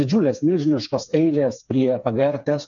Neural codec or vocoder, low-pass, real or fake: codec, 16 kHz, 4 kbps, FreqCodec, smaller model; 7.2 kHz; fake